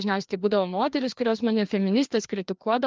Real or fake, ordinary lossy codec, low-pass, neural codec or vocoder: fake; Opus, 32 kbps; 7.2 kHz; codec, 16 kHz, 2 kbps, FreqCodec, larger model